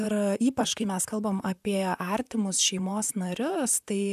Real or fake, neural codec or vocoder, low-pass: fake; vocoder, 44.1 kHz, 128 mel bands, Pupu-Vocoder; 14.4 kHz